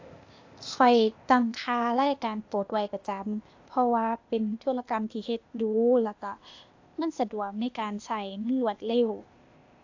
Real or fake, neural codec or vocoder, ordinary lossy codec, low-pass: fake; codec, 16 kHz, 0.8 kbps, ZipCodec; AAC, 48 kbps; 7.2 kHz